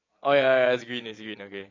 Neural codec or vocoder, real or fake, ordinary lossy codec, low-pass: vocoder, 22.05 kHz, 80 mel bands, Vocos; fake; AAC, 32 kbps; 7.2 kHz